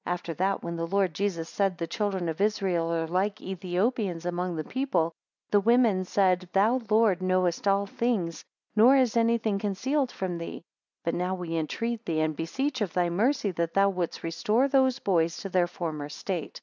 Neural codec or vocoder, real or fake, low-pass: none; real; 7.2 kHz